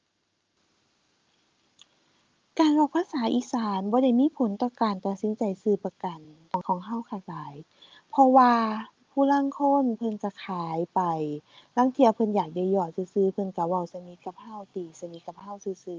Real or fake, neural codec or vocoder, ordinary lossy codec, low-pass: real; none; Opus, 24 kbps; 7.2 kHz